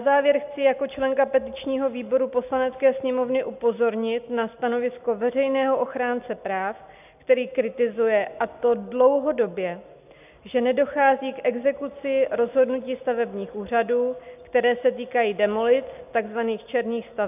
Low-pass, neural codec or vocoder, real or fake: 3.6 kHz; none; real